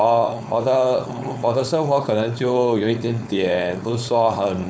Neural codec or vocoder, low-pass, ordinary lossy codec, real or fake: codec, 16 kHz, 4.8 kbps, FACodec; none; none; fake